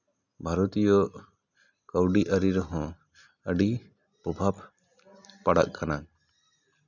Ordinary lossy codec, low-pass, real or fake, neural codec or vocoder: none; 7.2 kHz; real; none